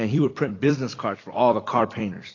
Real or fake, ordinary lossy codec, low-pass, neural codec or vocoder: fake; AAC, 32 kbps; 7.2 kHz; vocoder, 44.1 kHz, 128 mel bands every 256 samples, BigVGAN v2